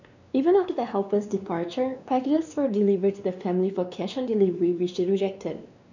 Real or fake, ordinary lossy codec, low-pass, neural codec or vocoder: fake; none; 7.2 kHz; codec, 16 kHz, 2 kbps, X-Codec, WavLM features, trained on Multilingual LibriSpeech